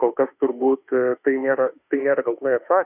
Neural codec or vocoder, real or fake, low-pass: autoencoder, 48 kHz, 32 numbers a frame, DAC-VAE, trained on Japanese speech; fake; 3.6 kHz